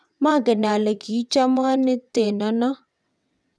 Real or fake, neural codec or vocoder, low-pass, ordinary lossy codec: fake; vocoder, 22.05 kHz, 80 mel bands, WaveNeXt; none; none